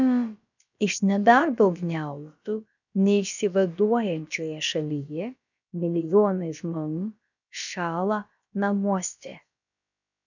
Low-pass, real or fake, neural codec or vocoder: 7.2 kHz; fake; codec, 16 kHz, about 1 kbps, DyCAST, with the encoder's durations